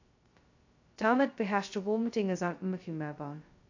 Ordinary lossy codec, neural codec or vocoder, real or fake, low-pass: AAC, 48 kbps; codec, 16 kHz, 0.2 kbps, FocalCodec; fake; 7.2 kHz